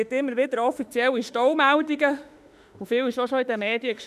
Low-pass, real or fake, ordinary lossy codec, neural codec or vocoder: 14.4 kHz; fake; none; autoencoder, 48 kHz, 32 numbers a frame, DAC-VAE, trained on Japanese speech